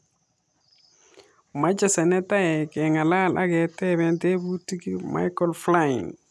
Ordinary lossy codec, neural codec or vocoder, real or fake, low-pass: none; none; real; none